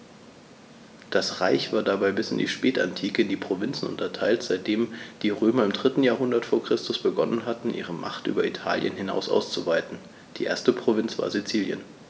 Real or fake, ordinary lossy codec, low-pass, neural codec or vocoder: real; none; none; none